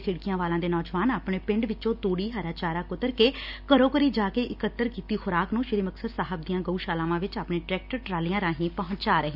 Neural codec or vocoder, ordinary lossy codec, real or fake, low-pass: none; none; real; 5.4 kHz